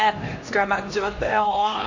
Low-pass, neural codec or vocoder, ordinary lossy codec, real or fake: 7.2 kHz; codec, 16 kHz, 1 kbps, X-Codec, HuBERT features, trained on LibriSpeech; AAC, 48 kbps; fake